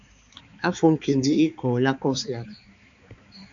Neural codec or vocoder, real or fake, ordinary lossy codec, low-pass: codec, 16 kHz, 4 kbps, X-Codec, HuBERT features, trained on balanced general audio; fake; AAC, 64 kbps; 7.2 kHz